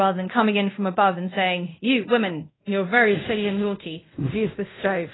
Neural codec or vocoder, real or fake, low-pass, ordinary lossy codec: codec, 24 kHz, 0.5 kbps, DualCodec; fake; 7.2 kHz; AAC, 16 kbps